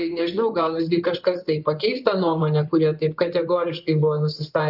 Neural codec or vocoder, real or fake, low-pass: codec, 16 kHz in and 24 kHz out, 2.2 kbps, FireRedTTS-2 codec; fake; 5.4 kHz